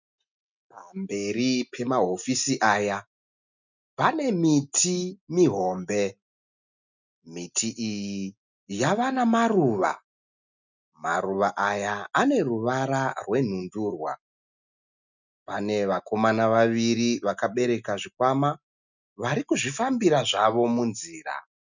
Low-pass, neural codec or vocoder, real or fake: 7.2 kHz; none; real